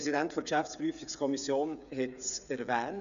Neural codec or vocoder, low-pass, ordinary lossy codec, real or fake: codec, 16 kHz, 8 kbps, FreqCodec, smaller model; 7.2 kHz; none; fake